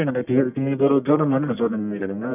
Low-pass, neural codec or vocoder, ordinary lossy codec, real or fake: 3.6 kHz; codec, 44.1 kHz, 1.7 kbps, Pupu-Codec; none; fake